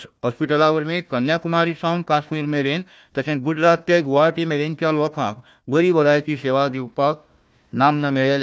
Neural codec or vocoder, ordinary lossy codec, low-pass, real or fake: codec, 16 kHz, 1 kbps, FunCodec, trained on Chinese and English, 50 frames a second; none; none; fake